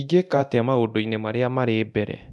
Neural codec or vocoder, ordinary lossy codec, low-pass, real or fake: codec, 24 kHz, 0.9 kbps, DualCodec; none; none; fake